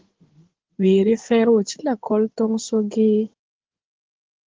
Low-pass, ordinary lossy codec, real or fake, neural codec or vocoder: 7.2 kHz; Opus, 16 kbps; fake; codec, 16 kHz in and 24 kHz out, 2.2 kbps, FireRedTTS-2 codec